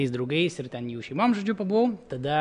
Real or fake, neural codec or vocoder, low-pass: real; none; 9.9 kHz